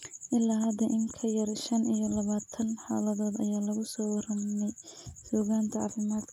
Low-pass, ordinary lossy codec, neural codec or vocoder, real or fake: 19.8 kHz; none; none; real